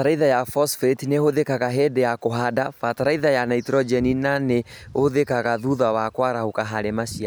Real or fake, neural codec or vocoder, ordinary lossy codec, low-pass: real; none; none; none